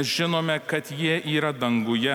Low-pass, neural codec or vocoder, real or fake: 19.8 kHz; none; real